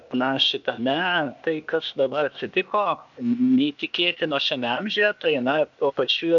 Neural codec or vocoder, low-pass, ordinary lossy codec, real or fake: codec, 16 kHz, 0.8 kbps, ZipCodec; 7.2 kHz; MP3, 96 kbps; fake